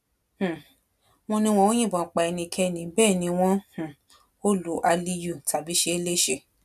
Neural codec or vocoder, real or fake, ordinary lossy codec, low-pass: none; real; none; 14.4 kHz